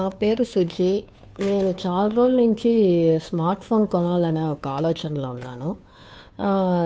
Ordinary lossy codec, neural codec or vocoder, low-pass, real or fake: none; codec, 16 kHz, 2 kbps, FunCodec, trained on Chinese and English, 25 frames a second; none; fake